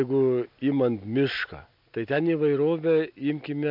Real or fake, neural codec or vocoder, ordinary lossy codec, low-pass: real; none; MP3, 48 kbps; 5.4 kHz